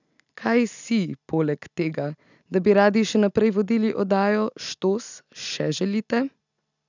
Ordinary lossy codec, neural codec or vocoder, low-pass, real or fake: none; none; 7.2 kHz; real